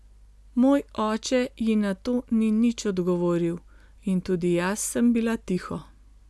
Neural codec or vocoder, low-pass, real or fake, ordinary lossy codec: none; none; real; none